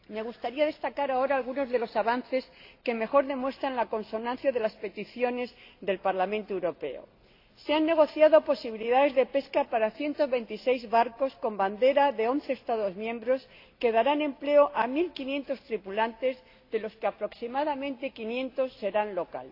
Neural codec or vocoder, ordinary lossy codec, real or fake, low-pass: none; AAC, 32 kbps; real; 5.4 kHz